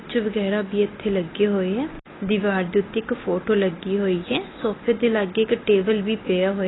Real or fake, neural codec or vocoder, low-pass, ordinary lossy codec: real; none; 7.2 kHz; AAC, 16 kbps